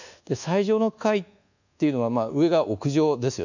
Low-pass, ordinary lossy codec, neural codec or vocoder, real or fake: 7.2 kHz; none; codec, 24 kHz, 1.2 kbps, DualCodec; fake